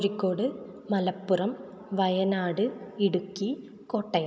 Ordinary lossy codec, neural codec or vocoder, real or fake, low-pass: none; none; real; none